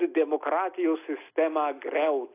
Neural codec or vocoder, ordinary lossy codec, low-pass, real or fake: none; AAC, 24 kbps; 3.6 kHz; real